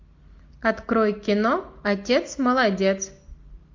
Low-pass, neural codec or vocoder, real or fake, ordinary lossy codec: 7.2 kHz; none; real; MP3, 48 kbps